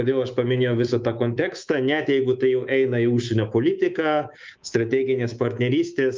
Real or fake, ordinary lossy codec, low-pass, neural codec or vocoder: fake; Opus, 24 kbps; 7.2 kHz; codec, 24 kHz, 3.1 kbps, DualCodec